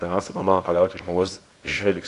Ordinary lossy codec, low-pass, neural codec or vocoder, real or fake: AAC, 48 kbps; 10.8 kHz; codec, 24 kHz, 0.9 kbps, WavTokenizer, small release; fake